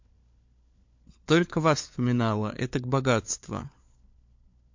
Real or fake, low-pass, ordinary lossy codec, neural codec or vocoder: fake; 7.2 kHz; MP3, 48 kbps; codec, 16 kHz, 4 kbps, FunCodec, trained on LibriTTS, 50 frames a second